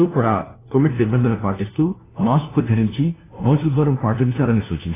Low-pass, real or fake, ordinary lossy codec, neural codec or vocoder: 3.6 kHz; fake; AAC, 16 kbps; codec, 16 kHz, 1 kbps, FunCodec, trained on LibriTTS, 50 frames a second